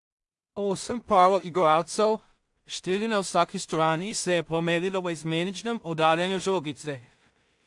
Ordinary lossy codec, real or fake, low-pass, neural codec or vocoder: AAC, 64 kbps; fake; 10.8 kHz; codec, 16 kHz in and 24 kHz out, 0.4 kbps, LongCat-Audio-Codec, two codebook decoder